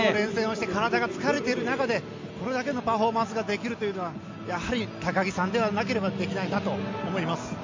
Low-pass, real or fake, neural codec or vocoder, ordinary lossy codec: 7.2 kHz; real; none; MP3, 48 kbps